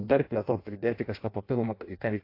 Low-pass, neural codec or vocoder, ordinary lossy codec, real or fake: 5.4 kHz; codec, 16 kHz in and 24 kHz out, 0.6 kbps, FireRedTTS-2 codec; AAC, 32 kbps; fake